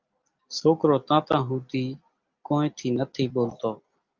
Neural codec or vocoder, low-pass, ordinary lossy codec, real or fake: none; 7.2 kHz; Opus, 24 kbps; real